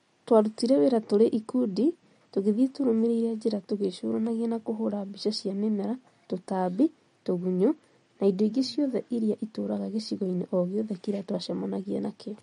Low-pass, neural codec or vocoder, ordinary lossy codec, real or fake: 19.8 kHz; none; MP3, 48 kbps; real